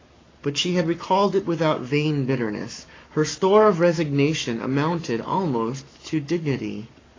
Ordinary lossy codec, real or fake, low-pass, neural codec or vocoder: AAC, 32 kbps; fake; 7.2 kHz; codec, 44.1 kHz, 7.8 kbps, Pupu-Codec